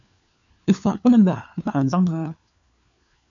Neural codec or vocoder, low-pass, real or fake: codec, 16 kHz, 4 kbps, FunCodec, trained on LibriTTS, 50 frames a second; 7.2 kHz; fake